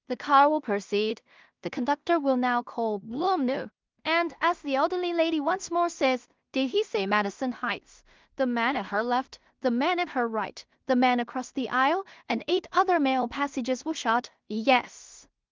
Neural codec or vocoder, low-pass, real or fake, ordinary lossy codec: codec, 16 kHz in and 24 kHz out, 0.4 kbps, LongCat-Audio-Codec, two codebook decoder; 7.2 kHz; fake; Opus, 32 kbps